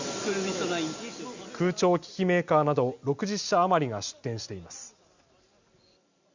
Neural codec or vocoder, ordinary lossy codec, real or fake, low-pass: none; Opus, 64 kbps; real; 7.2 kHz